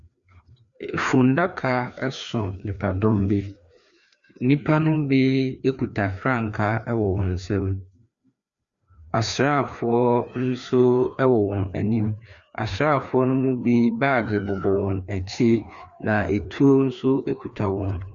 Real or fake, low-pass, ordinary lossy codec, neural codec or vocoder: fake; 7.2 kHz; Opus, 64 kbps; codec, 16 kHz, 2 kbps, FreqCodec, larger model